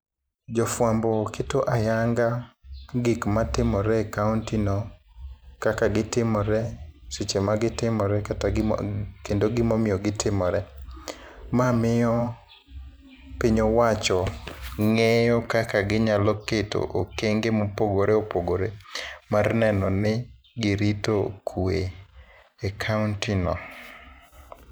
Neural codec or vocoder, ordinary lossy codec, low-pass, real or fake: vocoder, 44.1 kHz, 128 mel bands every 512 samples, BigVGAN v2; none; none; fake